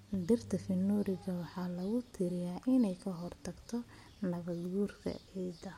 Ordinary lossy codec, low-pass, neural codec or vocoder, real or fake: MP3, 64 kbps; 19.8 kHz; none; real